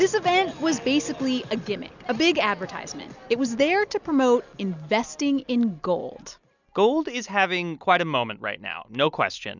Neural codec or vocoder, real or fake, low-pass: none; real; 7.2 kHz